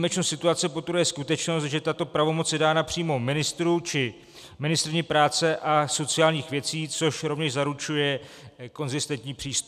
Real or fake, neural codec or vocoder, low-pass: real; none; 14.4 kHz